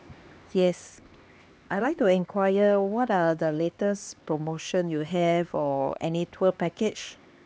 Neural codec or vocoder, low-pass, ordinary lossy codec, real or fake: codec, 16 kHz, 2 kbps, X-Codec, HuBERT features, trained on LibriSpeech; none; none; fake